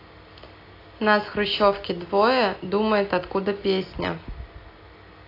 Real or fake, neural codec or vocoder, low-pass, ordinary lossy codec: real; none; 5.4 kHz; AAC, 32 kbps